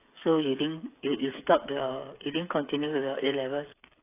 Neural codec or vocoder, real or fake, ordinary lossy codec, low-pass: codec, 16 kHz, 8 kbps, FreqCodec, smaller model; fake; AAC, 24 kbps; 3.6 kHz